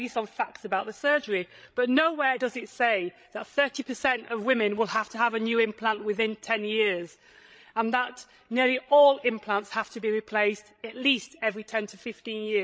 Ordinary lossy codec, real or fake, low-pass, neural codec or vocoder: none; fake; none; codec, 16 kHz, 16 kbps, FreqCodec, larger model